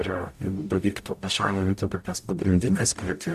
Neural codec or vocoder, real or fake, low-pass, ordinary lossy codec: codec, 44.1 kHz, 0.9 kbps, DAC; fake; 14.4 kHz; MP3, 96 kbps